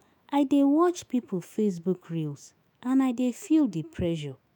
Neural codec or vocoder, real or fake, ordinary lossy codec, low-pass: autoencoder, 48 kHz, 128 numbers a frame, DAC-VAE, trained on Japanese speech; fake; none; 19.8 kHz